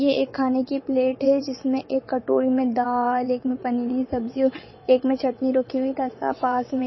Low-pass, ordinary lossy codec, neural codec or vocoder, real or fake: 7.2 kHz; MP3, 24 kbps; vocoder, 22.05 kHz, 80 mel bands, WaveNeXt; fake